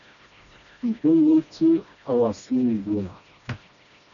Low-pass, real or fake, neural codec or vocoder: 7.2 kHz; fake; codec, 16 kHz, 1 kbps, FreqCodec, smaller model